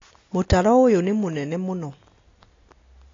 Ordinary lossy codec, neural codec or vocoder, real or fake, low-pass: AAC, 32 kbps; none; real; 7.2 kHz